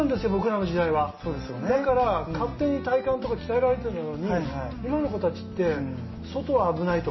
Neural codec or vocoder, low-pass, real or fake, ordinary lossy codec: none; 7.2 kHz; real; MP3, 24 kbps